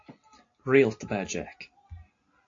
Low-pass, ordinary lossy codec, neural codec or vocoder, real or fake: 7.2 kHz; AAC, 48 kbps; none; real